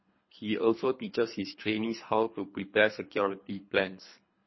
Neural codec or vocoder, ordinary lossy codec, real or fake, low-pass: codec, 24 kHz, 3 kbps, HILCodec; MP3, 24 kbps; fake; 7.2 kHz